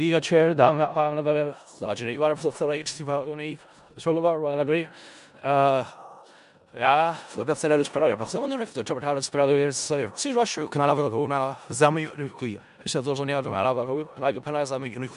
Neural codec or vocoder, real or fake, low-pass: codec, 16 kHz in and 24 kHz out, 0.4 kbps, LongCat-Audio-Codec, four codebook decoder; fake; 10.8 kHz